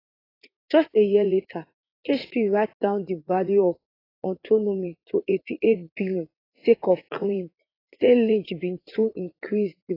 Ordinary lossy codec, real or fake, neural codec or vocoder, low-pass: AAC, 24 kbps; fake; vocoder, 44.1 kHz, 80 mel bands, Vocos; 5.4 kHz